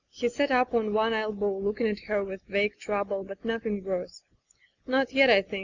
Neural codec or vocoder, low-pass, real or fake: none; 7.2 kHz; real